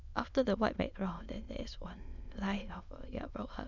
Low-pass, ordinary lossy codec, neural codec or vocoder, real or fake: 7.2 kHz; none; autoencoder, 22.05 kHz, a latent of 192 numbers a frame, VITS, trained on many speakers; fake